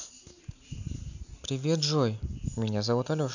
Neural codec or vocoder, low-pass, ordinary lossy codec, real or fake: none; 7.2 kHz; none; real